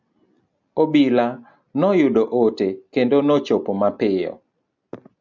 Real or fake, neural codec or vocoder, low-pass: real; none; 7.2 kHz